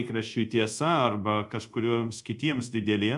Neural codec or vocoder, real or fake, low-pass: codec, 24 kHz, 0.5 kbps, DualCodec; fake; 10.8 kHz